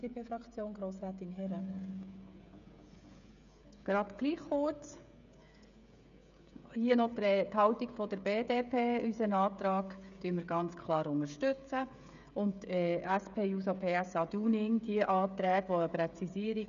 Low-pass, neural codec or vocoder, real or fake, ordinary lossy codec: 7.2 kHz; codec, 16 kHz, 16 kbps, FreqCodec, smaller model; fake; none